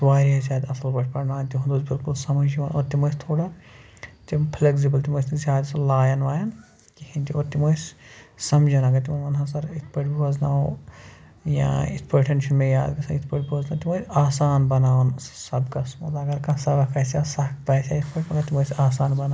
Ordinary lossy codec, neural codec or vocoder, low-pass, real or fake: none; none; none; real